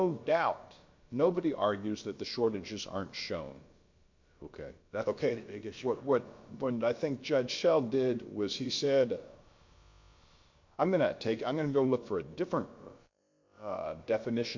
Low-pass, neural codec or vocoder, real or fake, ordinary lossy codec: 7.2 kHz; codec, 16 kHz, about 1 kbps, DyCAST, with the encoder's durations; fake; MP3, 48 kbps